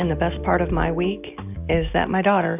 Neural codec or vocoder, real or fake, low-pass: none; real; 3.6 kHz